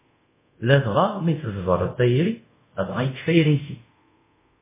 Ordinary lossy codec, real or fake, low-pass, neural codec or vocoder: MP3, 16 kbps; fake; 3.6 kHz; codec, 24 kHz, 0.5 kbps, DualCodec